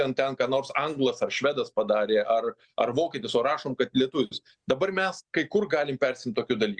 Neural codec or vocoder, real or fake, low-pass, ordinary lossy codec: none; real; 9.9 kHz; Opus, 64 kbps